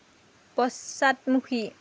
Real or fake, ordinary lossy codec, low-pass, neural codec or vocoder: real; none; none; none